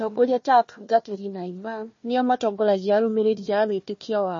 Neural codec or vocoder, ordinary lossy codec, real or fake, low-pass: codec, 16 kHz, 1 kbps, FunCodec, trained on Chinese and English, 50 frames a second; MP3, 32 kbps; fake; 7.2 kHz